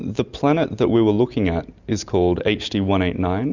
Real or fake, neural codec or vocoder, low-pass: real; none; 7.2 kHz